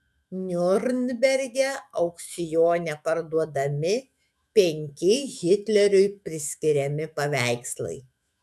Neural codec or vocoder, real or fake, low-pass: autoencoder, 48 kHz, 128 numbers a frame, DAC-VAE, trained on Japanese speech; fake; 14.4 kHz